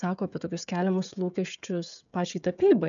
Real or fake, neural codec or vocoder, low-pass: fake; codec, 16 kHz, 16 kbps, FreqCodec, smaller model; 7.2 kHz